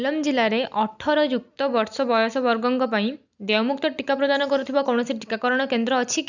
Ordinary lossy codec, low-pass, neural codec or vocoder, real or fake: none; 7.2 kHz; none; real